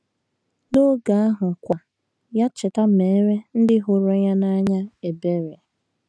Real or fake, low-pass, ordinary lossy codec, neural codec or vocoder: real; none; none; none